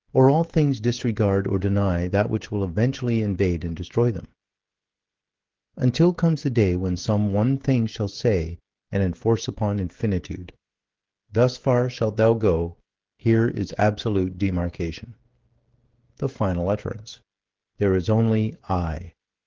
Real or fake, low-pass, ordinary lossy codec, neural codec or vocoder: fake; 7.2 kHz; Opus, 16 kbps; codec, 16 kHz, 16 kbps, FreqCodec, smaller model